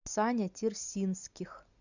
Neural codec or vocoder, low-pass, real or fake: none; 7.2 kHz; real